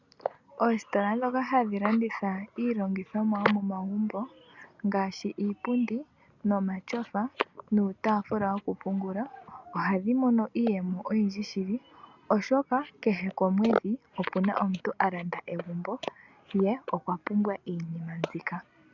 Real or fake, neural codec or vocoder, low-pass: real; none; 7.2 kHz